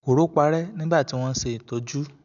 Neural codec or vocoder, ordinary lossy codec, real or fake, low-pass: none; none; real; 7.2 kHz